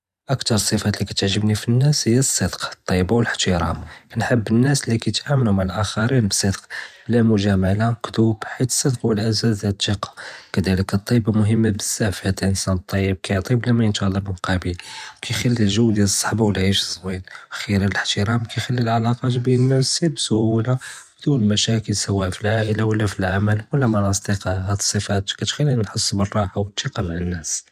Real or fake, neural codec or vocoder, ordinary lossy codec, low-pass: fake; vocoder, 44.1 kHz, 128 mel bands every 512 samples, BigVGAN v2; none; 14.4 kHz